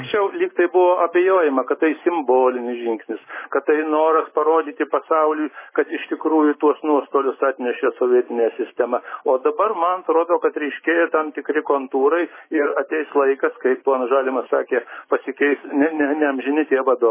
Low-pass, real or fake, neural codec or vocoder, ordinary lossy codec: 3.6 kHz; real; none; MP3, 16 kbps